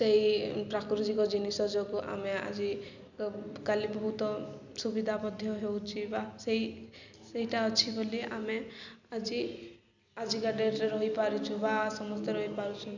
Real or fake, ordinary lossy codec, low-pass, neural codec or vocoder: real; none; 7.2 kHz; none